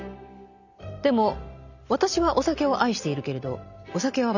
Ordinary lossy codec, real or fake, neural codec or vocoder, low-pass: none; real; none; 7.2 kHz